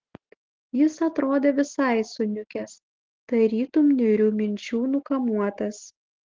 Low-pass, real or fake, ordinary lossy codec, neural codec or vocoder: 7.2 kHz; real; Opus, 16 kbps; none